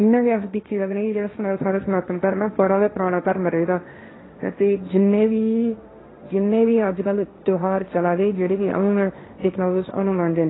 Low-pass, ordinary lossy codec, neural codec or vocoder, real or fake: 7.2 kHz; AAC, 16 kbps; codec, 16 kHz, 1.1 kbps, Voila-Tokenizer; fake